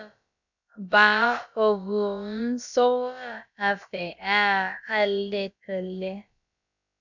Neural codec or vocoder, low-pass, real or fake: codec, 16 kHz, about 1 kbps, DyCAST, with the encoder's durations; 7.2 kHz; fake